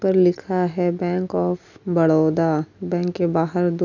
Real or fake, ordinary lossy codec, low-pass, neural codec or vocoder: real; none; 7.2 kHz; none